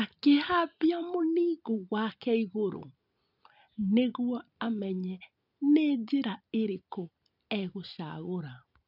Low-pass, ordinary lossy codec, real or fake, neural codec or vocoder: 5.4 kHz; none; real; none